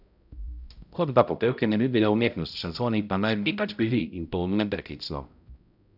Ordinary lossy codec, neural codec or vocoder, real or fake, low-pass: none; codec, 16 kHz, 0.5 kbps, X-Codec, HuBERT features, trained on balanced general audio; fake; 5.4 kHz